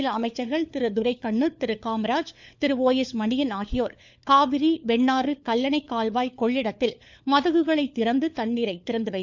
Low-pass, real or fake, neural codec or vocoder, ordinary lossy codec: none; fake; codec, 16 kHz, 4 kbps, FunCodec, trained on LibriTTS, 50 frames a second; none